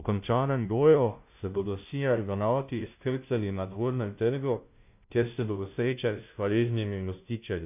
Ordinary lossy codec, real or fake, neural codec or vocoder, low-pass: none; fake; codec, 16 kHz, 0.5 kbps, FunCodec, trained on Chinese and English, 25 frames a second; 3.6 kHz